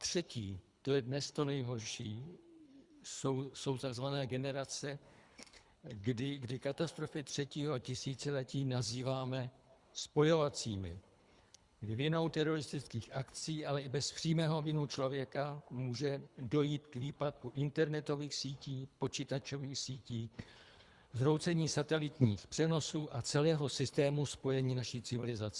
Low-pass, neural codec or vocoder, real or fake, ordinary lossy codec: 10.8 kHz; codec, 24 kHz, 3 kbps, HILCodec; fake; Opus, 64 kbps